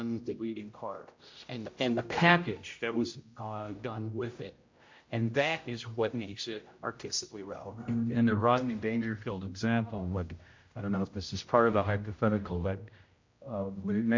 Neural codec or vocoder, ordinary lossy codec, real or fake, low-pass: codec, 16 kHz, 0.5 kbps, X-Codec, HuBERT features, trained on general audio; MP3, 48 kbps; fake; 7.2 kHz